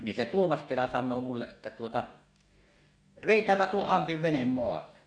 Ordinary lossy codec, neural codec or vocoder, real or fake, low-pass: none; codec, 44.1 kHz, 2.6 kbps, DAC; fake; 9.9 kHz